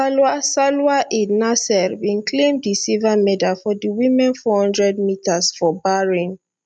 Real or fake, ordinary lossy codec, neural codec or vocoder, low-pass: real; none; none; 9.9 kHz